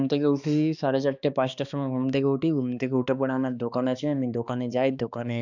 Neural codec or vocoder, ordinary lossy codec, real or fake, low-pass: codec, 16 kHz, 2 kbps, X-Codec, HuBERT features, trained on balanced general audio; none; fake; 7.2 kHz